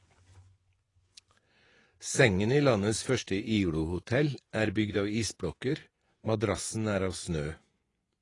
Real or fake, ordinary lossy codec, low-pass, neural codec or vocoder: fake; AAC, 32 kbps; 10.8 kHz; vocoder, 24 kHz, 100 mel bands, Vocos